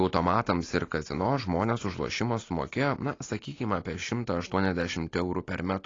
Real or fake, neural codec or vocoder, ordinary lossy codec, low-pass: real; none; AAC, 32 kbps; 7.2 kHz